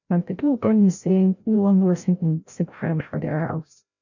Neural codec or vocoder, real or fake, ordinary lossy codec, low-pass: codec, 16 kHz, 0.5 kbps, FreqCodec, larger model; fake; none; 7.2 kHz